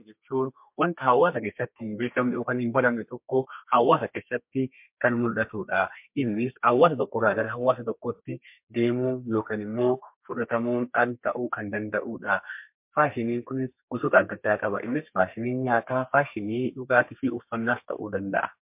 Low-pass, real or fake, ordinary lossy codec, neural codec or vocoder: 3.6 kHz; fake; MP3, 32 kbps; codec, 32 kHz, 1.9 kbps, SNAC